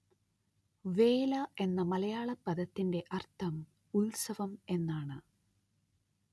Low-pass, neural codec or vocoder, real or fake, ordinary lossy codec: none; none; real; none